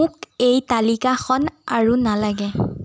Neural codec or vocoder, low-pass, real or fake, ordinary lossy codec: none; none; real; none